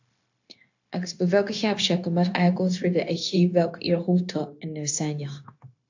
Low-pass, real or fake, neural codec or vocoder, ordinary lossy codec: 7.2 kHz; fake; codec, 16 kHz, 0.9 kbps, LongCat-Audio-Codec; AAC, 48 kbps